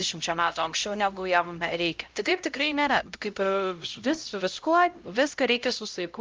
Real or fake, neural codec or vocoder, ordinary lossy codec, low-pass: fake; codec, 16 kHz, 0.5 kbps, X-Codec, HuBERT features, trained on LibriSpeech; Opus, 24 kbps; 7.2 kHz